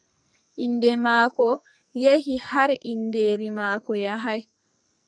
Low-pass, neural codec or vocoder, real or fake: 9.9 kHz; codec, 44.1 kHz, 2.6 kbps, SNAC; fake